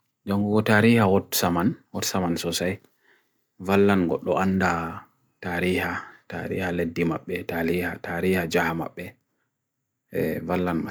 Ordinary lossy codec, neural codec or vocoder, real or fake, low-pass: none; none; real; none